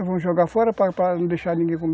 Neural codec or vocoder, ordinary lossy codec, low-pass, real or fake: none; none; none; real